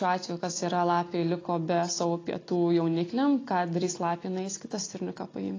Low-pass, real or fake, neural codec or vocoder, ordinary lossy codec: 7.2 kHz; real; none; AAC, 32 kbps